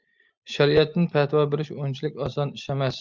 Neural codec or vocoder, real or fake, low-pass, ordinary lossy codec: none; real; 7.2 kHz; Opus, 32 kbps